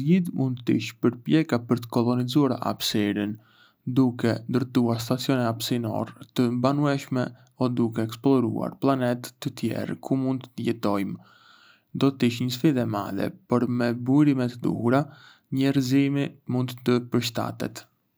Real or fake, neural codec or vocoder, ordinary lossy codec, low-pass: real; none; none; none